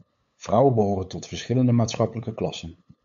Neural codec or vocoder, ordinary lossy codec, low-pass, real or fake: codec, 16 kHz, 8 kbps, FunCodec, trained on LibriTTS, 25 frames a second; MP3, 48 kbps; 7.2 kHz; fake